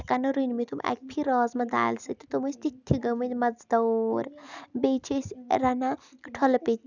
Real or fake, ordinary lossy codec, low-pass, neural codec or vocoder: real; none; 7.2 kHz; none